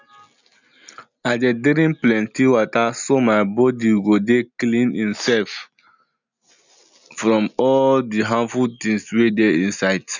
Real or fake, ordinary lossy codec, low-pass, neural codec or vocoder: real; none; 7.2 kHz; none